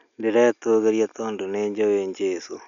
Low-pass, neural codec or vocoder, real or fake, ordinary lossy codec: 7.2 kHz; none; real; none